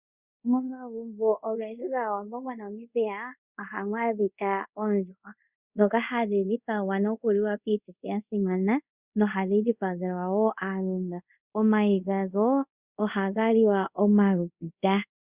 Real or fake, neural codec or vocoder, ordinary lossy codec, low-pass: fake; codec, 24 kHz, 0.5 kbps, DualCodec; Opus, 64 kbps; 3.6 kHz